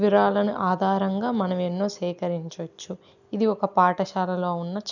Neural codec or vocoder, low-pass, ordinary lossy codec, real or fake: none; 7.2 kHz; none; real